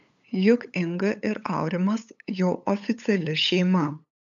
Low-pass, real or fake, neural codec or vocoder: 7.2 kHz; fake; codec, 16 kHz, 8 kbps, FunCodec, trained on LibriTTS, 25 frames a second